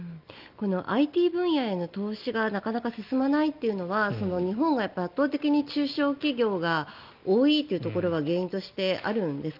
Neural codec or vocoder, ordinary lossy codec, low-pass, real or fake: none; Opus, 24 kbps; 5.4 kHz; real